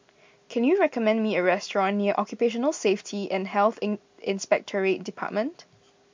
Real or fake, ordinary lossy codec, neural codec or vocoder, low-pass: real; MP3, 64 kbps; none; 7.2 kHz